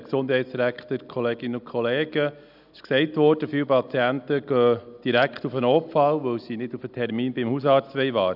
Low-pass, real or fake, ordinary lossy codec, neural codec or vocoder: 5.4 kHz; real; none; none